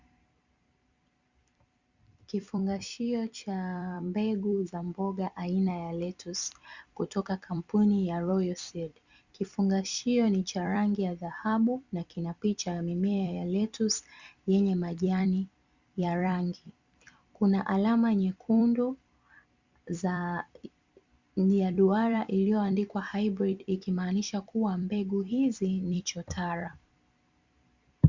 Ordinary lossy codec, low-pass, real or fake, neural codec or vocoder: Opus, 64 kbps; 7.2 kHz; fake; vocoder, 44.1 kHz, 128 mel bands every 256 samples, BigVGAN v2